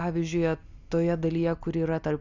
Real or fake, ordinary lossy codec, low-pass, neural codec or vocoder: real; Opus, 64 kbps; 7.2 kHz; none